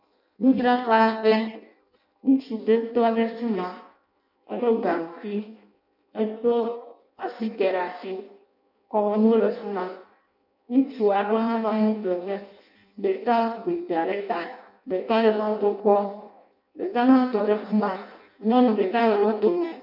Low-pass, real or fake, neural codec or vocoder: 5.4 kHz; fake; codec, 16 kHz in and 24 kHz out, 0.6 kbps, FireRedTTS-2 codec